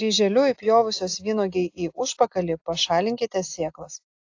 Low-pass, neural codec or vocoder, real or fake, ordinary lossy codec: 7.2 kHz; none; real; AAC, 48 kbps